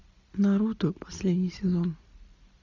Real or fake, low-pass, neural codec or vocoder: real; 7.2 kHz; none